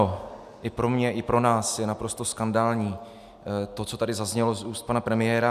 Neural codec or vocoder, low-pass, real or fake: none; 14.4 kHz; real